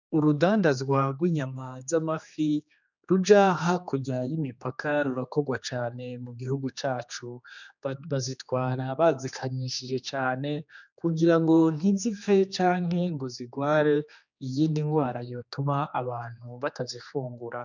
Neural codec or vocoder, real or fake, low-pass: codec, 16 kHz, 2 kbps, X-Codec, HuBERT features, trained on general audio; fake; 7.2 kHz